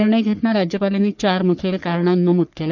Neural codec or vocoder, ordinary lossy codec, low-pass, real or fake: codec, 44.1 kHz, 3.4 kbps, Pupu-Codec; none; 7.2 kHz; fake